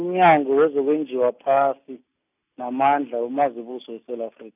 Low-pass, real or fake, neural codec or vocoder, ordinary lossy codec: 3.6 kHz; real; none; none